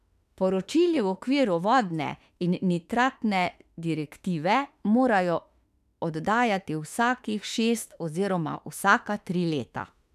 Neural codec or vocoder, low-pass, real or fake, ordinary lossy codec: autoencoder, 48 kHz, 32 numbers a frame, DAC-VAE, trained on Japanese speech; 14.4 kHz; fake; none